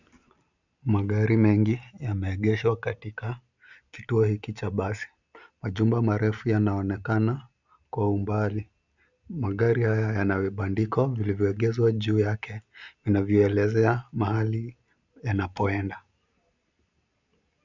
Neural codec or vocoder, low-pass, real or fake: none; 7.2 kHz; real